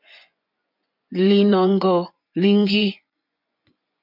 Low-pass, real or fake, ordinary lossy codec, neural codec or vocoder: 5.4 kHz; fake; MP3, 48 kbps; vocoder, 24 kHz, 100 mel bands, Vocos